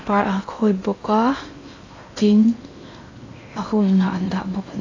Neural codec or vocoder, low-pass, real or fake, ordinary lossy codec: codec, 16 kHz in and 24 kHz out, 0.6 kbps, FocalCodec, streaming, 2048 codes; 7.2 kHz; fake; AAC, 32 kbps